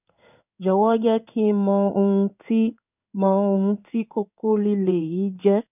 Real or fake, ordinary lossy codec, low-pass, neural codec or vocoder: fake; none; 3.6 kHz; codec, 16 kHz in and 24 kHz out, 1 kbps, XY-Tokenizer